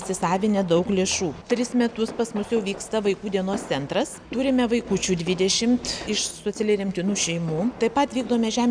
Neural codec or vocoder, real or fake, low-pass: none; real; 9.9 kHz